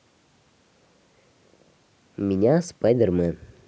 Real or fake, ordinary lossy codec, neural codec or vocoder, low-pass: real; none; none; none